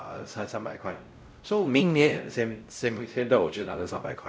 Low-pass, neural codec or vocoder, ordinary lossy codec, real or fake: none; codec, 16 kHz, 0.5 kbps, X-Codec, WavLM features, trained on Multilingual LibriSpeech; none; fake